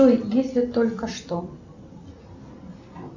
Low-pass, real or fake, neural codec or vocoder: 7.2 kHz; real; none